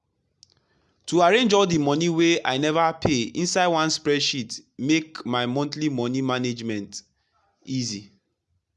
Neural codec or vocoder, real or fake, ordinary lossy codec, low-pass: none; real; none; none